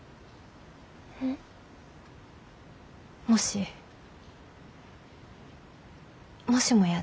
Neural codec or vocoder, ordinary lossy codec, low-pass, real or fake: none; none; none; real